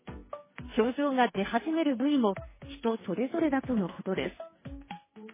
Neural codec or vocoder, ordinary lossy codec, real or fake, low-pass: codec, 44.1 kHz, 2.6 kbps, SNAC; MP3, 16 kbps; fake; 3.6 kHz